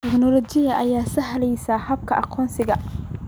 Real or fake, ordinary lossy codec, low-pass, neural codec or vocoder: real; none; none; none